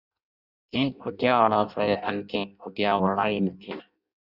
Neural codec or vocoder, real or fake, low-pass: codec, 16 kHz in and 24 kHz out, 0.6 kbps, FireRedTTS-2 codec; fake; 5.4 kHz